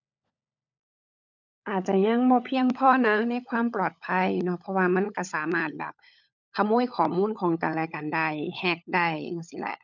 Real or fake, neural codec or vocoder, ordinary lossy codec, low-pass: fake; codec, 16 kHz, 16 kbps, FunCodec, trained on LibriTTS, 50 frames a second; none; 7.2 kHz